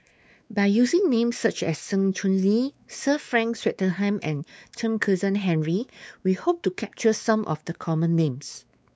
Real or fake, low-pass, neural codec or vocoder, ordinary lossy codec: fake; none; codec, 16 kHz, 4 kbps, X-Codec, WavLM features, trained on Multilingual LibriSpeech; none